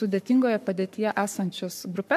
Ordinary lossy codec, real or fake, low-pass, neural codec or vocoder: MP3, 96 kbps; fake; 14.4 kHz; codec, 44.1 kHz, 7.8 kbps, Pupu-Codec